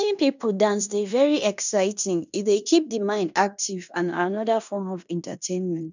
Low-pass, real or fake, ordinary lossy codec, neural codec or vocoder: 7.2 kHz; fake; none; codec, 16 kHz in and 24 kHz out, 0.9 kbps, LongCat-Audio-Codec, fine tuned four codebook decoder